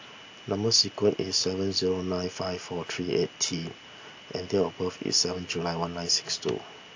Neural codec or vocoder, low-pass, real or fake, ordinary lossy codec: none; 7.2 kHz; real; AAC, 48 kbps